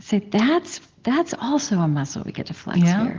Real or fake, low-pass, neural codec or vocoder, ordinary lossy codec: real; 7.2 kHz; none; Opus, 16 kbps